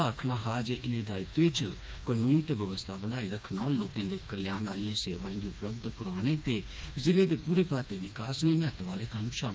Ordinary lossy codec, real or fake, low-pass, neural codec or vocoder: none; fake; none; codec, 16 kHz, 2 kbps, FreqCodec, smaller model